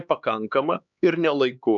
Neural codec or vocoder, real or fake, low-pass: codec, 16 kHz, 4 kbps, X-Codec, HuBERT features, trained on LibriSpeech; fake; 7.2 kHz